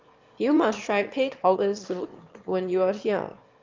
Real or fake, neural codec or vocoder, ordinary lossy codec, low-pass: fake; autoencoder, 22.05 kHz, a latent of 192 numbers a frame, VITS, trained on one speaker; Opus, 32 kbps; 7.2 kHz